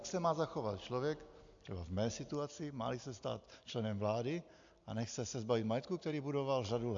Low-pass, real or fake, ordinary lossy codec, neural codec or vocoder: 7.2 kHz; real; AAC, 64 kbps; none